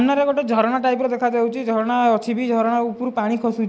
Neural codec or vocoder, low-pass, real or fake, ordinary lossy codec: none; none; real; none